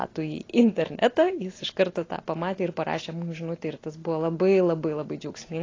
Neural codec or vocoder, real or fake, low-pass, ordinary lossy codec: none; real; 7.2 kHz; AAC, 32 kbps